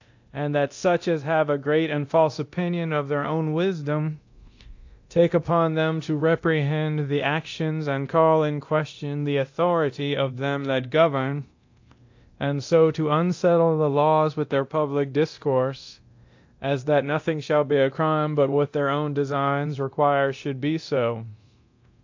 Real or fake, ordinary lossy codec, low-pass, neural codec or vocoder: fake; AAC, 48 kbps; 7.2 kHz; codec, 24 kHz, 0.9 kbps, DualCodec